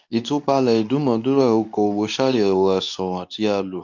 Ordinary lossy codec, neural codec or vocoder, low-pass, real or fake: none; codec, 24 kHz, 0.9 kbps, WavTokenizer, medium speech release version 2; 7.2 kHz; fake